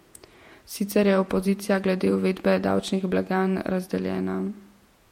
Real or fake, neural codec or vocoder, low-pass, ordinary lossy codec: fake; vocoder, 48 kHz, 128 mel bands, Vocos; 19.8 kHz; MP3, 64 kbps